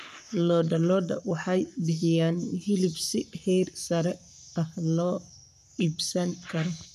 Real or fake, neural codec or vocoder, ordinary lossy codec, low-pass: fake; codec, 44.1 kHz, 7.8 kbps, Pupu-Codec; none; 14.4 kHz